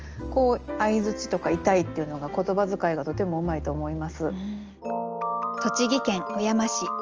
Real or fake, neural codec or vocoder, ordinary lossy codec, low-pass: real; none; Opus, 24 kbps; 7.2 kHz